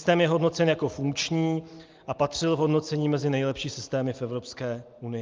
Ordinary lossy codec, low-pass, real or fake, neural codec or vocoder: Opus, 24 kbps; 7.2 kHz; real; none